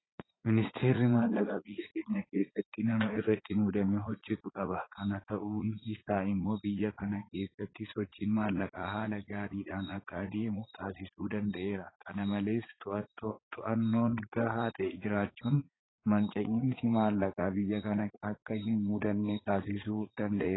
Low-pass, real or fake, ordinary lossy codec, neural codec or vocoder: 7.2 kHz; fake; AAC, 16 kbps; vocoder, 44.1 kHz, 80 mel bands, Vocos